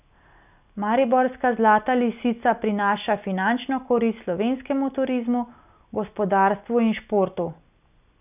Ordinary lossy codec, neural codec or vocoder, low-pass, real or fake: none; none; 3.6 kHz; real